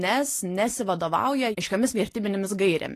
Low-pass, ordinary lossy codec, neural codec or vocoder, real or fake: 14.4 kHz; AAC, 48 kbps; none; real